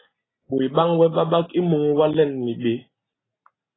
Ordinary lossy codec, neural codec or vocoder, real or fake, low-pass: AAC, 16 kbps; none; real; 7.2 kHz